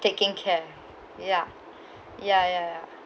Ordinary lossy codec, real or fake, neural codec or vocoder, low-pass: none; real; none; none